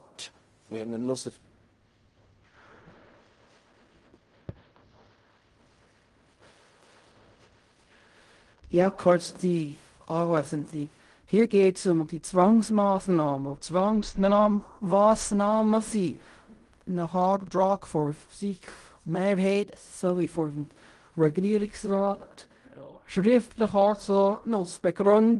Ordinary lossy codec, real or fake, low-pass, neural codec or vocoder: Opus, 24 kbps; fake; 10.8 kHz; codec, 16 kHz in and 24 kHz out, 0.4 kbps, LongCat-Audio-Codec, fine tuned four codebook decoder